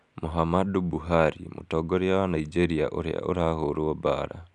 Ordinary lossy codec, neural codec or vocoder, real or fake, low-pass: none; none; real; 10.8 kHz